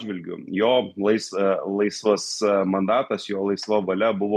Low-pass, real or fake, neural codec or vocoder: 14.4 kHz; real; none